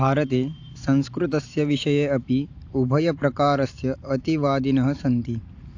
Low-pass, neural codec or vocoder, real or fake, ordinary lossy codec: 7.2 kHz; vocoder, 44.1 kHz, 128 mel bands every 512 samples, BigVGAN v2; fake; AAC, 48 kbps